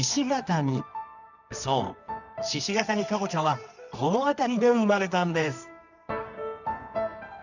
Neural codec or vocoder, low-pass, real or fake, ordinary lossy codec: codec, 24 kHz, 0.9 kbps, WavTokenizer, medium music audio release; 7.2 kHz; fake; none